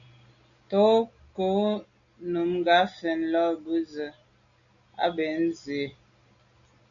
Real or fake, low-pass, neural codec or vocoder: real; 7.2 kHz; none